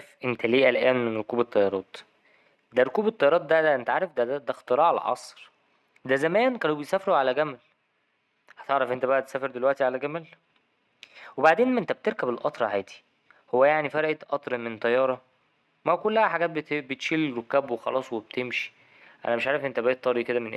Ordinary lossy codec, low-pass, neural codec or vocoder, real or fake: none; none; none; real